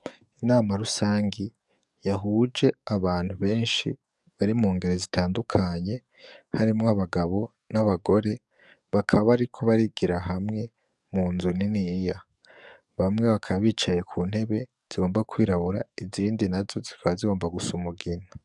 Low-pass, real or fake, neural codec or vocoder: 10.8 kHz; fake; codec, 44.1 kHz, 7.8 kbps, DAC